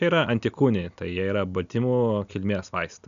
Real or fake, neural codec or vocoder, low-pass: real; none; 7.2 kHz